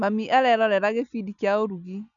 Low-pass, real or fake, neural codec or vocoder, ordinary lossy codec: 7.2 kHz; real; none; none